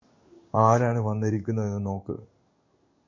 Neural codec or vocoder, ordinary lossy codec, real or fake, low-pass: codec, 16 kHz in and 24 kHz out, 1 kbps, XY-Tokenizer; MP3, 48 kbps; fake; 7.2 kHz